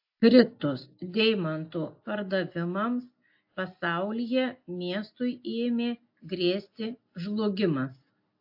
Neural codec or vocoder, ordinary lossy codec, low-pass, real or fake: none; AAC, 48 kbps; 5.4 kHz; real